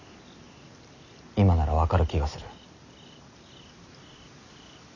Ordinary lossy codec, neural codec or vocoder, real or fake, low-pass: none; none; real; 7.2 kHz